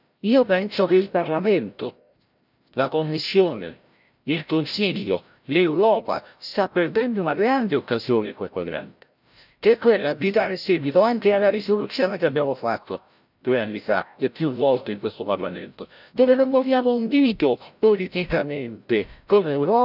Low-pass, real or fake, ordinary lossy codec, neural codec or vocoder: 5.4 kHz; fake; none; codec, 16 kHz, 0.5 kbps, FreqCodec, larger model